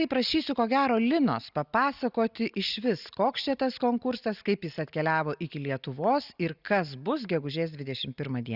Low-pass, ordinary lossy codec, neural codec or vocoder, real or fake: 5.4 kHz; Opus, 64 kbps; none; real